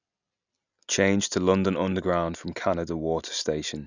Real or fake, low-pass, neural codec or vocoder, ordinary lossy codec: real; 7.2 kHz; none; none